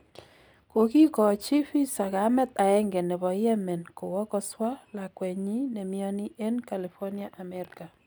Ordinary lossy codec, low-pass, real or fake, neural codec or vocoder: none; none; real; none